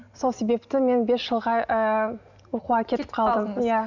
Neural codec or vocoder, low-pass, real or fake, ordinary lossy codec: none; 7.2 kHz; real; none